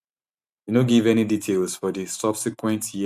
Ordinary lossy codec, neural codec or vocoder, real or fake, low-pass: none; vocoder, 44.1 kHz, 128 mel bands every 512 samples, BigVGAN v2; fake; 14.4 kHz